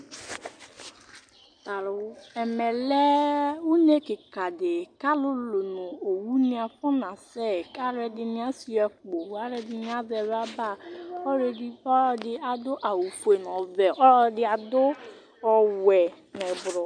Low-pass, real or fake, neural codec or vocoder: 9.9 kHz; real; none